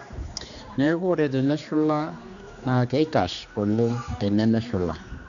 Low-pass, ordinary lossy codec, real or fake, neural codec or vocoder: 7.2 kHz; MP3, 96 kbps; fake; codec, 16 kHz, 2 kbps, X-Codec, HuBERT features, trained on general audio